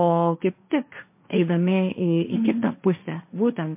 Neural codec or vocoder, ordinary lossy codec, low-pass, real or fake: codec, 16 kHz, 1.1 kbps, Voila-Tokenizer; MP3, 24 kbps; 3.6 kHz; fake